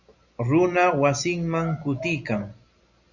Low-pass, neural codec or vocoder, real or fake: 7.2 kHz; none; real